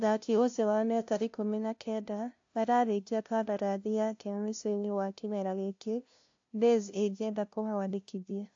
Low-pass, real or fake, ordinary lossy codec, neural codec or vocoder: 7.2 kHz; fake; AAC, 48 kbps; codec, 16 kHz, 0.5 kbps, FunCodec, trained on LibriTTS, 25 frames a second